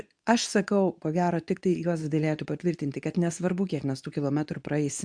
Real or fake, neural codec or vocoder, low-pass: fake; codec, 24 kHz, 0.9 kbps, WavTokenizer, medium speech release version 2; 9.9 kHz